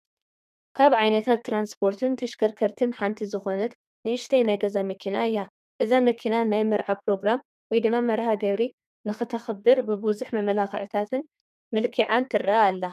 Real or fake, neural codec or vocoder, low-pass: fake; codec, 32 kHz, 1.9 kbps, SNAC; 14.4 kHz